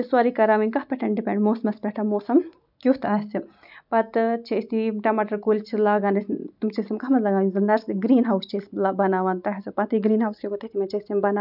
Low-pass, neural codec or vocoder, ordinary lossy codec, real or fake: 5.4 kHz; none; none; real